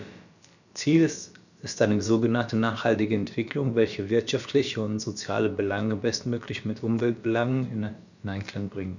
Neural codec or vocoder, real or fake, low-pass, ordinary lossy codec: codec, 16 kHz, about 1 kbps, DyCAST, with the encoder's durations; fake; 7.2 kHz; none